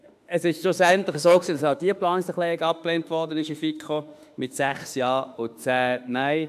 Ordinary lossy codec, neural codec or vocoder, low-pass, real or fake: AAC, 96 kbps; autoencoder, 48 kHz, 32 numbers a frame, DAC-VAE, trained on Japanese speech; 14.4 kHz; fake